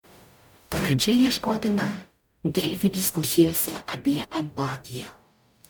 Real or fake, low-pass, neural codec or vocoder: fake; 19.8 kHz; codec, 44.1 kHz, 0.9 kbps, DAC